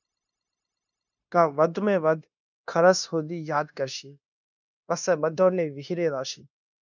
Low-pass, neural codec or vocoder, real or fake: 7.2 kHz; codec, 16 kHz, 0.9 kbps, LongCat-Audio-Codec; fake